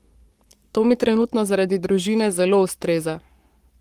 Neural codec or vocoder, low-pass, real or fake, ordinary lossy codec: codec, 44.1 kHz, 7.8 kbps, Pupu-Codec; 14.4 kHz; fake; Opus, 24 kbps